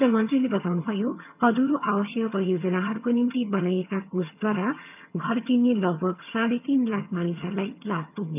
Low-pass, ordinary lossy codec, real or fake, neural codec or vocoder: 3.6 kHz; none; fake; vocoder, 22.05 kHz, 80 mel bands, HiFi-GAN